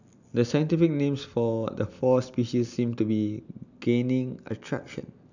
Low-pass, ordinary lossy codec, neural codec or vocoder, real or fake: 7.2 kHz; none; none; real